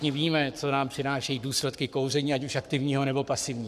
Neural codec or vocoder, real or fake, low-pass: codec, 44.1 kHz, 7.8 kbps, Pupu-Codec; fake; 14.4 kHz